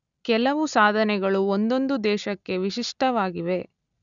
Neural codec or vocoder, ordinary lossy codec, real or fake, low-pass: none; none; real; 7.2 kHz